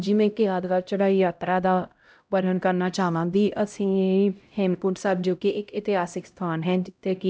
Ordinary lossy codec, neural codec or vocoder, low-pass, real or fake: none; codec, 16 kHz, 0.5 kbps, X-Codec, HuBERT features, trained on LibriSpeech; none; fake